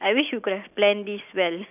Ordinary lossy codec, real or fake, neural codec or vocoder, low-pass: none; real; none; 3.6 kHz